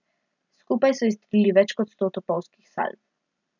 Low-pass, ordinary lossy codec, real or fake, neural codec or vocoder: 7.2 kHz; none; real; none